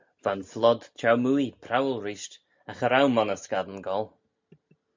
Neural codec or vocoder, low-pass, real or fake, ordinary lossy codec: none; 7.2 kHz; real; MP3, 64 kbps